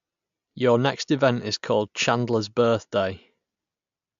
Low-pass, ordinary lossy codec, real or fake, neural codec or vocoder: 7.2 kHz; MP3, 64 kbps; real; none